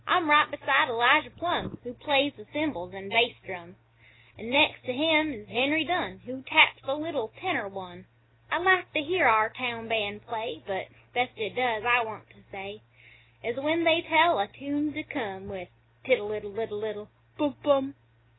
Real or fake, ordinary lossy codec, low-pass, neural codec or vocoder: real; AAC, 16 kbps; 7.2 kHz; none